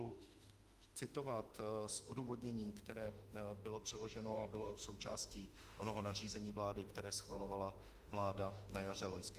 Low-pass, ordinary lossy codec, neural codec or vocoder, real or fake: 14.4 kHz; Opus, 32 kbps; autoencoder, 48 kHz, 32 numbers a frame, DAC-VAE, trained on Japanese speech; fake